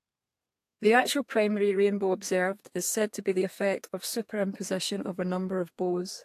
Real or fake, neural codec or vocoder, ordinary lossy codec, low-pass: fake; codec, 32 kHz, 1.9 kbps, SNAC; AAC, 64 kbps; 14.4 kHz